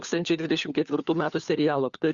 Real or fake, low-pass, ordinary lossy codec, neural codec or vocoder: fake; 7.2 kHz; Opus, 64 kbps; codec, 16 kHz, 4 kbps, FunCodec, trained on LibriTTS, 50 frames a second